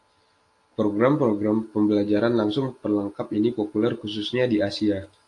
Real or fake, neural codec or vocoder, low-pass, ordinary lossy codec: real; none; 10.8 kHz; AAC, 48 kbps